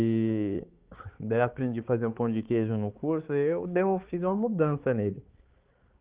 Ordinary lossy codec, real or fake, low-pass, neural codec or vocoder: Opus, 16 kbps; fake; 3.6 kHz; codec, 16 kHz, 4 kbps, X-Codec, HuBERT features, trained on balanced general audio